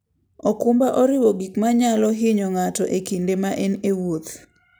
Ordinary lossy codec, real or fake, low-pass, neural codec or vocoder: none; real; none; none